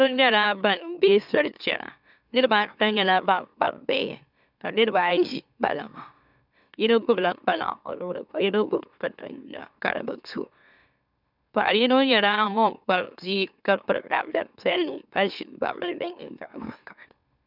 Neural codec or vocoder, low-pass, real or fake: autoencoder, 44.1 kHz, a latent of 192 numbers a frame, MeloTTS; 5.4 kHz; fake